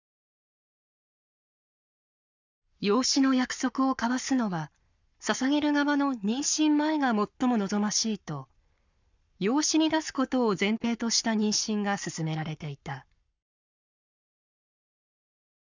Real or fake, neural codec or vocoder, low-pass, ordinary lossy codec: fake; codec, 44.1 kHz, 7.8 kbps, Pupu-Codec; 7.2 kHz; none